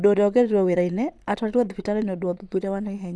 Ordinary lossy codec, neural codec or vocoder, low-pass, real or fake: none; vocoder, 22.05 kHz, 80 mel bands, Vocos; none; fake